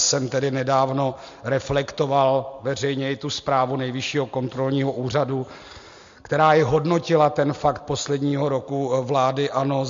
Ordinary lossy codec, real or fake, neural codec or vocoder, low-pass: MP3, 48 kbps; real; none; 7.2 kHz